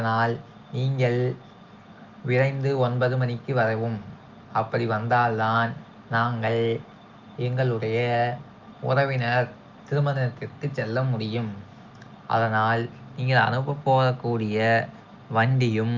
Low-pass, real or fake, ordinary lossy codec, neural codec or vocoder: 7.2 kHz; real; Opus, 32 kbps; none